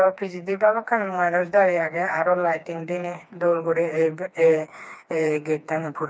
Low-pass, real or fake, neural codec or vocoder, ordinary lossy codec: none; fake; codec, 16 kHz, 2 kbps, FreqCodec, smaller model; none